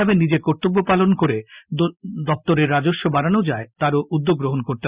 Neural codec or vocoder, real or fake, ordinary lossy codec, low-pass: none; real; Opus, 64 kbps; 3.6 kHz